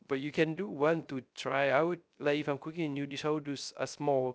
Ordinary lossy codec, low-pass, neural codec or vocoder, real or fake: none; none; codec, 16 kHz, 0.3 kbps, FocalCodec; fake